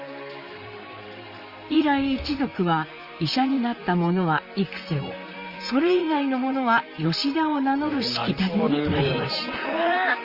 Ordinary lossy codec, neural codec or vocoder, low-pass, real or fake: Opus, 32 kbps; vocoder, 44.1 kHz, 128 mel bands, Pupu-Vocoder; 5.4 kHz; fake